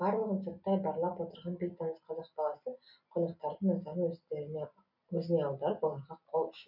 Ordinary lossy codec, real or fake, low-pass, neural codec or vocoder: none; real; 5.4 kHz; none